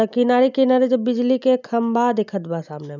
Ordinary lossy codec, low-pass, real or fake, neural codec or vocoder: none; 7.2 kHz; real; none